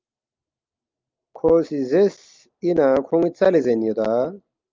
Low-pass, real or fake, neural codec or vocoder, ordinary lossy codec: 7.2 kHz; real; none; Opus, 24 kbps